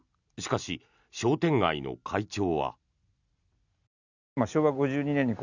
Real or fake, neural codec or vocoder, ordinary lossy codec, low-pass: real; none; none; 7.2 kHz